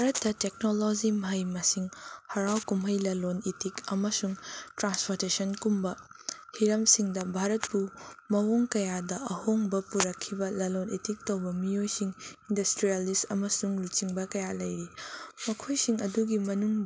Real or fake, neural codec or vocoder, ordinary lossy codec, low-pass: real; none; none; none